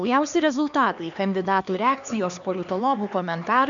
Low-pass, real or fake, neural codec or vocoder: 7.2 kHz; fake; codec, 16 kHz, 2 kbps, X-Codec, HuBERT features, trained on LibriSpeech